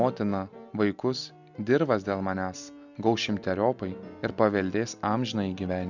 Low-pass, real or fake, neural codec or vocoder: 7.2 kHz; real; none